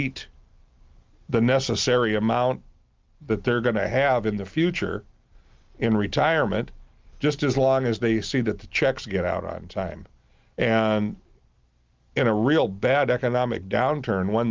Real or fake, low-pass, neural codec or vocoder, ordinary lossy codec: real; 7.2 kHz; none; Opus, 32 kbps